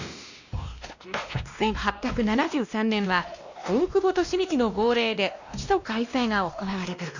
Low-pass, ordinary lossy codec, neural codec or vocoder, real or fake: 7.2 kHz; none; codec, 16 kHz, 1 kbps, X-Codec, WavLM features, trained on Multilingual LibriSpeech; fake